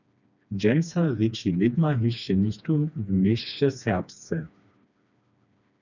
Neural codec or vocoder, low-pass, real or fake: codec, 16 kHz, 2 kbps, FreqCodec, smaller model; 7.2 kHz; fake